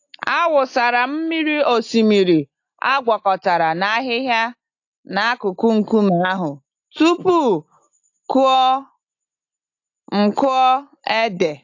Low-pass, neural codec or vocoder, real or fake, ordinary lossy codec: 7.2 kHz; none; real; AAC, 48 kbps